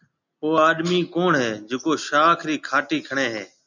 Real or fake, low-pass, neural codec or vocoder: real; 7.2 kHz; none